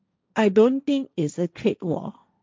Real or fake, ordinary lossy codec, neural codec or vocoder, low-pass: fake; none; codec, 16 kHz, 1.1 kbps, Voila-Tokenizer; none